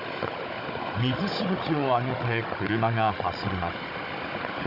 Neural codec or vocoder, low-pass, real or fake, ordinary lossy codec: codec, 16 kHz, 16 kbps, FunCodec, trained on Chinese and English, 50 frames a second; 5.4 kHz; fake; none